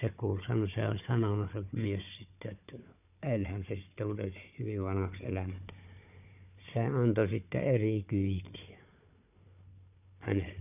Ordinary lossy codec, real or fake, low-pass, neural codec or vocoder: none; fake; 3.6 kHz; codec, 16 kHz, 4 kbps, FunCodec, trained on Chinese and English, 50 frames a second